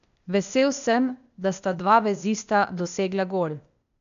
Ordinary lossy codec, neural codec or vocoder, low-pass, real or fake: none; codec, 16 kHz, 0.8 kbps, ZipCodec; 7.2 kHz; fake